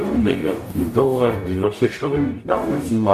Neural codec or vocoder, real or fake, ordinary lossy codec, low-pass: codec, 44.1 kHz, 0.9 kbps, DAC; fake; MP3, 96 kbps; 14.4 kHz